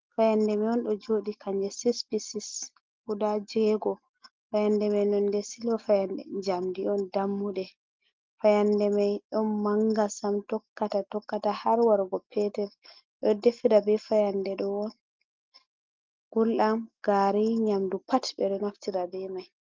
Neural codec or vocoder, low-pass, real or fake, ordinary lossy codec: none; 7.2 kHz; real; Opus, 32 kbps